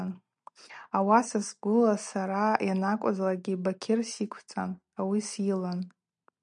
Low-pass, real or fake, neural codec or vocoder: 9.9 kHz; real; none